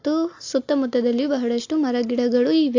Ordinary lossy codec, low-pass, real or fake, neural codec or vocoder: AAC, 48 kbps; 7.2 kHz; real; none